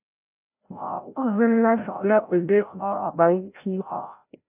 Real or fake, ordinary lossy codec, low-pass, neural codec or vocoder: fake; AAC, 32 kbps; 3.6 kHz; codec, 16 kHz, 0.5 kbps, FreqCodec, larger model